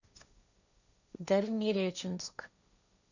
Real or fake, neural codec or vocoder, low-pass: fake; codec, 16 kHz, 1.1 kbps, Voila-Tokenizer; 7.2 kHz